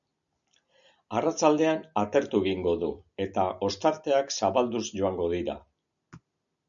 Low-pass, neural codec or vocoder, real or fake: 7.2 kHz; none; real